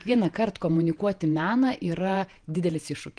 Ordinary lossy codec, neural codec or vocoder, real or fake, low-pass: Opus, 24 kbps; vocoder, 48 kHz, 128 mel bands, Vocos; fake; 9.9 kHz